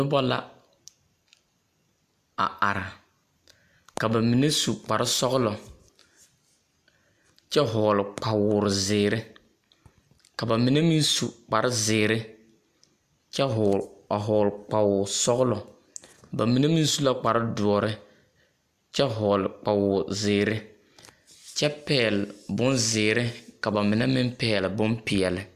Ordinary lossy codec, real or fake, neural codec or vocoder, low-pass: AAC, 96 kbps; real; none; 14.4 kHz